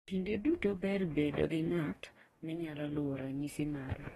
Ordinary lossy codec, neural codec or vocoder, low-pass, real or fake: AAC, 32 kbps; codec, 44.1 kHz, 2.6 kbps, DAC; 19.8 kHz; fake